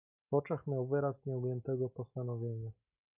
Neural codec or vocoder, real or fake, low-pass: none; real; 3.6 kHz